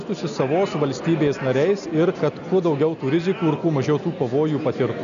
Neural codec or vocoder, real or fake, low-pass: none; real; 7.2 kHz